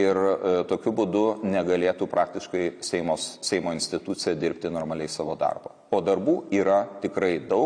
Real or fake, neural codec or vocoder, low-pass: real; none; 9.9 kHz